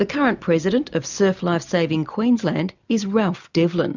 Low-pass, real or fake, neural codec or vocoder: 7.2 kHz; real; none